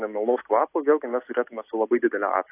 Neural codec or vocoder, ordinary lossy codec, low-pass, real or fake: none; AAC, 24 kbps; 3.6 kHz; real